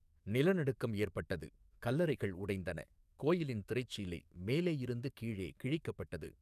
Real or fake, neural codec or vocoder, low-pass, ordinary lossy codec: fake; codec, 44.1 kHz, 7.8 kbps, DAC; 14.4 kHz; none